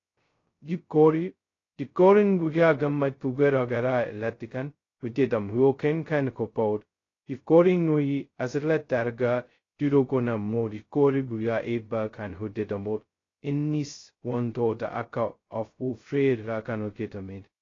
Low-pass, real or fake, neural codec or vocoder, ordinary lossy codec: 7.2 kHz; fake; codec, 16 kHz, 0.2 kbps, FocalCodec; AAC, 32 kbps